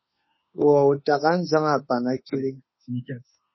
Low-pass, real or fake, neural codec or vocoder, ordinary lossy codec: 7.2 kHz; fake; autoencoder, 48 kHz, 32 numbers a frame, DAC-VAE, trained on Japanese speech; MP3, 24 kbps